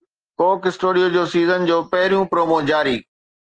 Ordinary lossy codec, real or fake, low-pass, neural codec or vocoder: Opus, 16 kbps; real; 9.9 kHz; none